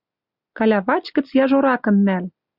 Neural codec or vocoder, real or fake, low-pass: none; real; 5.4 kHz